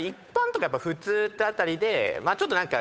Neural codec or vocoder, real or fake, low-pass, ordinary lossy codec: codec, 16 kHz, 8 kbps, FunCodec, trained on Chinese and English, 25 frames a second; fake; none; none